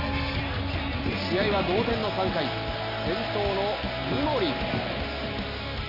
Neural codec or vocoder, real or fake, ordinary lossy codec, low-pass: none; real; none; 5.4 kHz